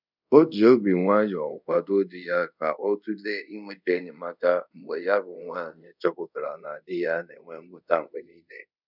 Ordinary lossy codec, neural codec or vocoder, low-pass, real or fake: none; codec, 24 kHz, 0.5 kbps, DualCodec; 5.4 kHz; fake